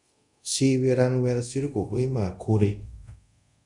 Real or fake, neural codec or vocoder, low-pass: fake; codec, 24 kHz, 0.5 kbps, DualCodec; 10.8 kHz